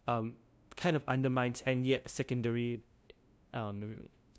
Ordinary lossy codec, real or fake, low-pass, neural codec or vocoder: none; fake; none; codec, 16 kHz, 0.5 kbps, FunCodec, trained on LibriTTS, 25 frames a second